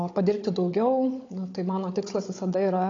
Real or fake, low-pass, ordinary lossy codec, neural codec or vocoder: fake; 7.2 kHz; AAC, 32 kbps; codec, 16 kHz, 16 kbps, FunCodec, trained on Chinese and English, 50 frames a second